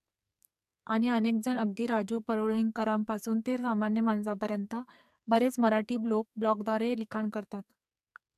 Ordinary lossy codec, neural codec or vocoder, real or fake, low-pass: AAC, 96 kbps; codec, 44.1 kHz, 2.6 kbps, SNAC; fake; 14.4 kHz